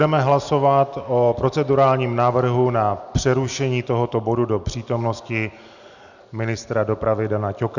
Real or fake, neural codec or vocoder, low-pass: real; none; 7.2 kHz